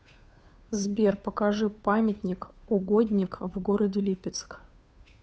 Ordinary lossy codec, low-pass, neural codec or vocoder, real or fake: none; none; codec, 16 kHz, 2 kbps, FunCodec, trained on Chinese and English, 25 frames a second; fake